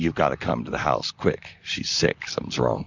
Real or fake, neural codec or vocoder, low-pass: fake; vocoder, 44.1 kHz, 128 mel bands, Pupu-Vocoder; 7.2 kHz